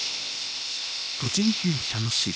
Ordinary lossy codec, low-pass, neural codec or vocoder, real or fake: none; none; codec, 16 kHz, 0.8 kbps, ZipCodec; fake